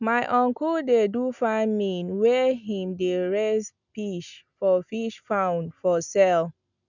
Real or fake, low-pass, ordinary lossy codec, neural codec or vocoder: real; 7.2 kHz; none; none